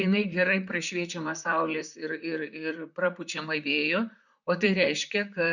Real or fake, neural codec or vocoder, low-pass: fake; vocoder, 44.1 kHz, 128 mel bands, Pupu-Vocoder; 7.2 kHz